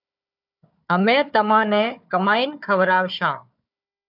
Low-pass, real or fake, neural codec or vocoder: 5.4 kHz; fake; codec, 16 kHz, 4 kbps, FunCodec, trained on Chinese and English, 50 frames a second